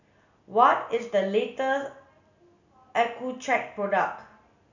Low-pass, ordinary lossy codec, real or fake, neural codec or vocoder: 7.2 kHz; none; real; none